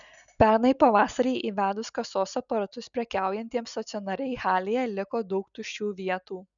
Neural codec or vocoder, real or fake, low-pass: none; real; 7.2 kHz